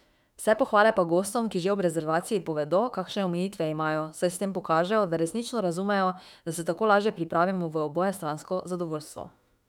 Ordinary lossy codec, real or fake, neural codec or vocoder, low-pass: none; fake; autoencoder, 48 kHz, 32 numbers a frame, DAC-VAE, trained on Japanese speech; 19.8 kHz